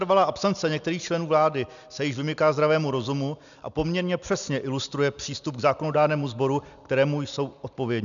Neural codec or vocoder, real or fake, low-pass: none; real; 7.2 kHz